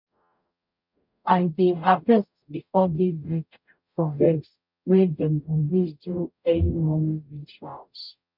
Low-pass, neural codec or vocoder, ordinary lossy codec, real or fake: 5.4 kHz; codec, 44.1 kHz, 0.9 kbps, DAC; none; fake